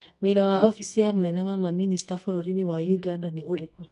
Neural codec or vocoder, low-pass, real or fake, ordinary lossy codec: codec, 24 kHz, 0.9 kbps, WavTokenizer, medium music audio release; 10.8 kHz; fake; none